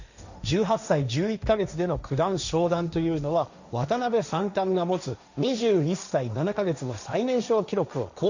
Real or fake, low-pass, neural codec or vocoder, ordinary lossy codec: fake; 7.2 kHz; codec, 16 kHz, 1.1 kbps, Voila-Tokenizer; none